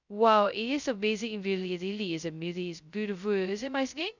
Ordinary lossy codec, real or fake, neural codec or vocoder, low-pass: none; fake; codec, 16 kHz, 0.2 kbps, FocalCodec; 7.2 kHz